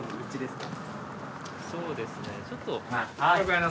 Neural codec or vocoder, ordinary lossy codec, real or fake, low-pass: none; none; real; none